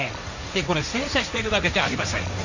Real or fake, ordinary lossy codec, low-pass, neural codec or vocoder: fake; none; 7.2 kHz; codec, 16 kHz, 1.1 kbps, Voila-Tokenizer